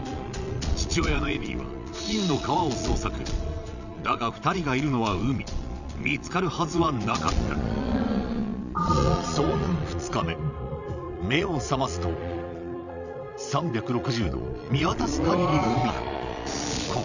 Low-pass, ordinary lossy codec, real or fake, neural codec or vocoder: 7.2 kHz; none; fake; vocoder, 22.05 kHz, 80 mel bands, Vocos